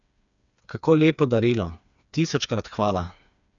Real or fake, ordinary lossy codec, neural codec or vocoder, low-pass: fake; none; codec, 16 kHz, 4 kbps, FreqCodec, smaller model; 7.2 kHz